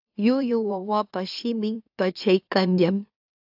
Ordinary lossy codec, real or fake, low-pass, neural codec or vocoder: AAC, 48 kbps; fake; 5.4 kHz; autoencoder, 44.1 kHz, a latent of 192 numbers a frame, MeloTTS